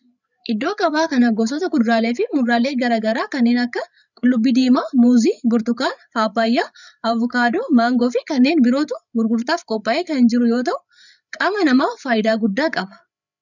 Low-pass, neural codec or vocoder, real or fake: 7.2 kHz; codec, 16 kHz, 8 kbps, FreqCodec, larger model; fake